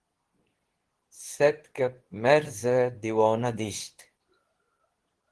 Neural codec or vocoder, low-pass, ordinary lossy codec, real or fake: codec, 24 kHz, 0.9 kbps, WavTokenizer, medium speech release version 2; 10.8 kHz; Opus, 16 kbps; fake